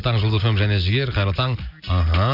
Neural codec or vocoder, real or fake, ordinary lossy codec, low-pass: none; real; none; 5.4 kHz